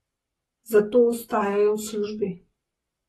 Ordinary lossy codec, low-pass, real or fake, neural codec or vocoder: AAC, 32 kbps; 19.8 kHz; fake; codec, 44.1 kHz, 7.8 kbps, Pupu-Codec